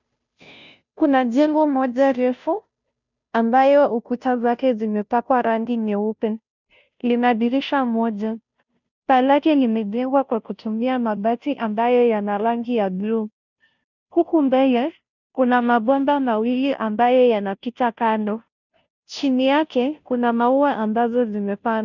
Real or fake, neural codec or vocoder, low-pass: fake; codec, 16 kHz, 0.5 kbps, FunCodec, trained on Chinese and English, 25 frames a second; 7.2 kHz